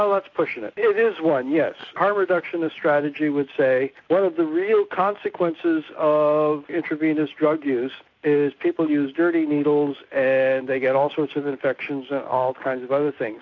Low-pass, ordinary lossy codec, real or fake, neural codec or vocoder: 7.2 kHz; AAC, 48 kbps; real; none